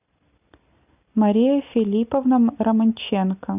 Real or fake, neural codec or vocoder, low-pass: real; none; 3.6 kHz